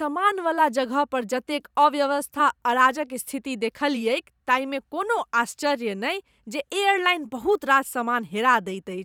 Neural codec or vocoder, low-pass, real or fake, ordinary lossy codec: vocoder, 44.1 kHz, 128 mel bands every 512 samples, BigVGAN v2; 19.8 kHz; fake; none